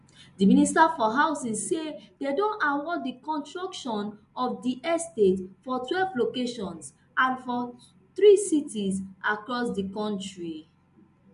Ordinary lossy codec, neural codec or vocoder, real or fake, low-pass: MP3, 64 kbps; none; real; 10.8 kHz